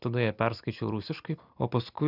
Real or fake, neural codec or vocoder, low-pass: real; none; 5.4 kHz